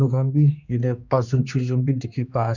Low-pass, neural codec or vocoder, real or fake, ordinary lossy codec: 7.2 kHz; codec, 16 kHz, 2 kbps, X-Codec, HuBERT features, trained on general audio; fake; none